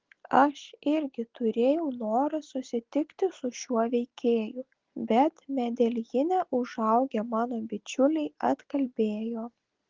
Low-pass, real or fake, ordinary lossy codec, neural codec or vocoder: 7.2 kHz; real; Opus, 16 kbps; none